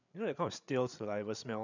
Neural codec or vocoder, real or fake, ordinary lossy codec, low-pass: codec, 16 kHz, 8 kbps, FreqCodec, larger model; fake; none; 7.2 kHz